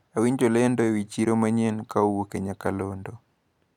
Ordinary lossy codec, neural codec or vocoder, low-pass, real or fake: none; none; 19.8 kHz; real